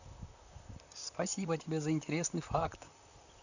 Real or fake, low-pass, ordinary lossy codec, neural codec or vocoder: fake; 7.2 kHz; none; vocoder, 44.1 kHz, 128 mel bands, Pupu-Vocoder